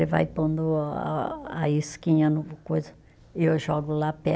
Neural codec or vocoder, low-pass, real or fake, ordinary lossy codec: none; none; real; none